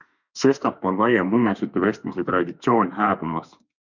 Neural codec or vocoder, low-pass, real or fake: autoencoder, 48 kHz, 32 numbers a frame, DAC-VAE, trained on Japanese speech; 7.2 kHz; fake